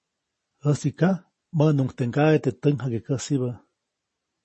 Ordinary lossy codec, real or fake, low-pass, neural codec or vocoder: MP3, 32 kbps; real; 10.8 kHz; none